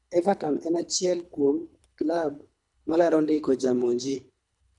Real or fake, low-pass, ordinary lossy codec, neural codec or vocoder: fake; 10.8 kHz; none; codec, 24 kHz, 3 kbps, HILCodec